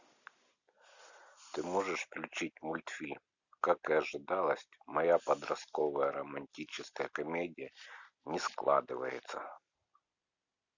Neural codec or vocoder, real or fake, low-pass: none; real; 7.2 kHz